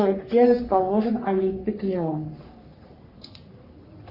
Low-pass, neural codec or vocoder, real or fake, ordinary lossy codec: 5.4 kHz; codec, 44.1 kHz, 3.4 kbps, Pupu-Codec; fake; Opus, 64 kbps